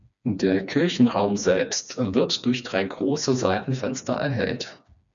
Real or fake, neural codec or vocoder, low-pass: fake; codec, 16 kHz, 2 kbps, FreqCodec, smaller model; 7.2 kHz